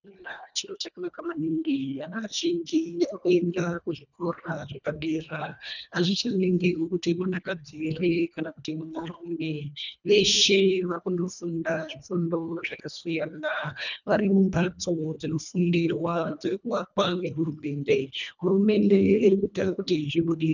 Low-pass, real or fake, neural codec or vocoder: 7.2 kHz; fake; codec, 24 kHz, 1.5 kbps, HILCodec